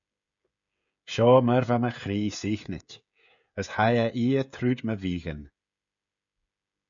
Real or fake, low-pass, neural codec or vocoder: fake; 7.2 kHz; codec, 16 kHz, 16 kbps, FreqCodec, smaller model